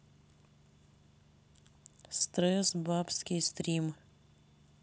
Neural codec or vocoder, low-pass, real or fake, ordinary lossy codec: none; none; real; none